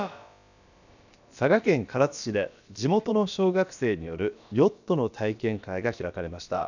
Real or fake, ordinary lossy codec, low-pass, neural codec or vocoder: fake; none; 7.2 kHz; codec, 16 kHz, about 1 kbps, DyCAST, with the encoder's durations